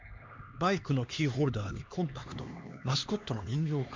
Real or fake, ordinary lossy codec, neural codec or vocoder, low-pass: fake; MP3, 64 kbps; codec, 16 kHz, 2 kbps, X-Codec, HuBERT features, trained on LibriSpeech; 7.2 kHz